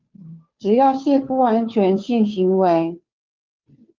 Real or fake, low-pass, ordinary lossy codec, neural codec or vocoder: fake; 7.2 kHz; Opus, 24 kbps; codec, 16 kHz, 2 kbps, FunCodec, trained on Chinese and English, 25 frames a second